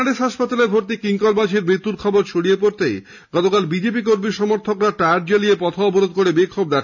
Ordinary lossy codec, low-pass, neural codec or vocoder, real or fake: none; 7.2 kHz; none; real